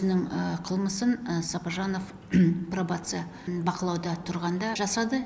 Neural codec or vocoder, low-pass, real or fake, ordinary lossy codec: none; none; real; none